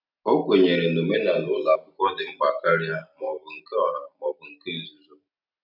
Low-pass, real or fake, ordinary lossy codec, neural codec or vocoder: 5.4 kHz; real; none; none